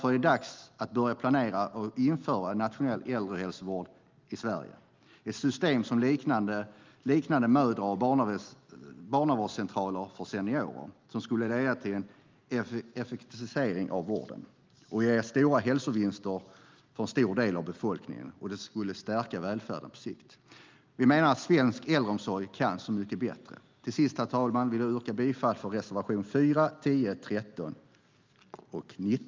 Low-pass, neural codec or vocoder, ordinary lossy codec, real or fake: 7.2 kHz; none; Opus, 24 kbps; real